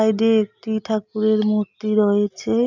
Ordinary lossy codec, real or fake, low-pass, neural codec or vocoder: none; real; 7.2 kHz; none